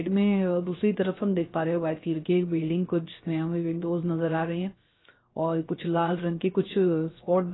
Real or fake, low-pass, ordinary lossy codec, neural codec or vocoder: fake; 7.2 kHz; AAC, 16 kbps; codec, 16 kHz, 0.3 kbps, FocalCodec